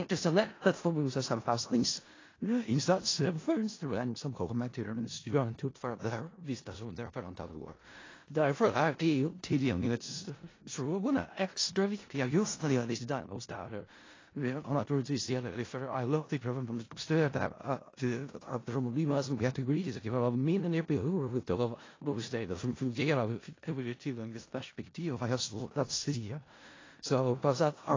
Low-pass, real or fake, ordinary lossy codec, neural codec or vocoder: 7.2 kHz; fake; AAC, 32 kbps; codec, 16 kHz in and 24 kHz out, 0.4 kbps, LongCat-Audio-Codec, four codebook decoder